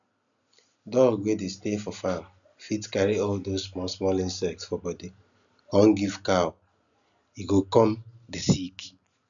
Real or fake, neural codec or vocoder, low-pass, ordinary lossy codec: real; none; 7.2 kHz; none